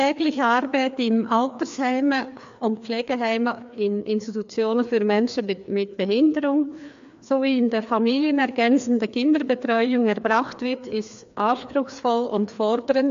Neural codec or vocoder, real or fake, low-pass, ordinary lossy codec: codec, 16 kHz, 2 kbps, FreqCodec, larger model; fake; 7.2 kHz; AAC, 64 kbps